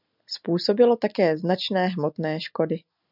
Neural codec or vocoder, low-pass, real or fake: none; 5.4 kHz; real